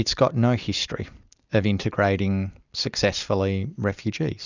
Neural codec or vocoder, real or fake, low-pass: none; real; 7.2 kHz